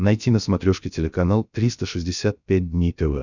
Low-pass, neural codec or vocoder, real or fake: 7.2 kHz; codec, 16 kHz, 0.7 kbps, FocalCodec; fake